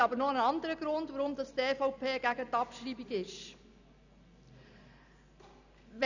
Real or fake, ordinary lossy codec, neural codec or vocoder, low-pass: real; none; none; 7.2 kHz